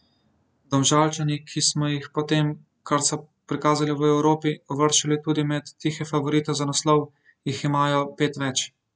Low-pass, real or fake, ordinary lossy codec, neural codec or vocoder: none; real; none; none